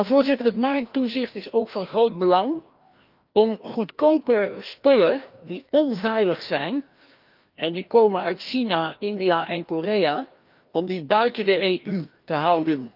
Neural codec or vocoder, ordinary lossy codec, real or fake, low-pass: codec, 16 kHz, 1 kbps, FreqCodec, larger model; Opus, 24 kbps; fake; 5.4 kHz